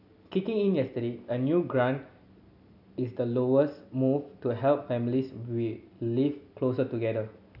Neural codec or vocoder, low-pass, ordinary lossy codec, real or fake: none; 5.4 kHz; none; real